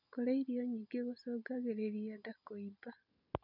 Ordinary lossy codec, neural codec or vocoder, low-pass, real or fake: none; none; 5.4 kHz; real